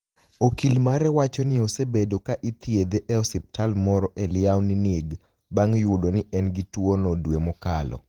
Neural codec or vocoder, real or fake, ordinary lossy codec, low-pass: vocoder, 48 kHz, 128 mel bands, Vocos; fake; Opus, 24 kbps; 19.8 kHz